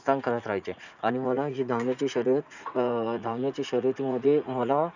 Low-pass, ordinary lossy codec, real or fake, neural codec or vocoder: 7.2 kHz; none; fake; vocoder, 44.1 kHz, 80 mel bands, Vocos